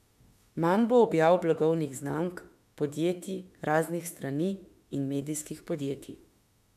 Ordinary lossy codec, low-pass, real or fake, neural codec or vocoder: none; 14.4 kHz; fake; autoencoder, 48 kHz, 32 numbers a frame, DAC-VAE, trained on Japanese speech